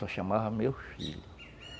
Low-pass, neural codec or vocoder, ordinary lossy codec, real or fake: none; none; none; real